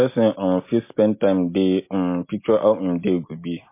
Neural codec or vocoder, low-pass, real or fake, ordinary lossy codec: none; 3.6 kHz; real; MP3, 24 kbps